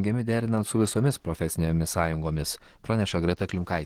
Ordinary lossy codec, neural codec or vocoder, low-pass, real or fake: Opus, 16 kbps; codec, 44.1 kHz, 7.8 kbps, DAC; 19.8 kHz; fake